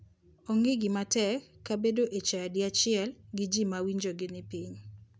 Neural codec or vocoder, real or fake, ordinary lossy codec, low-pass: none; real; none; none